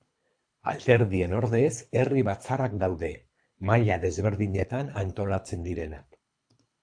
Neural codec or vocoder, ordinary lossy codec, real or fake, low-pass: codec, 24 kHz, 3 kbps, HILCodec; AAC, 64 kbps; fake; 9.9 kHz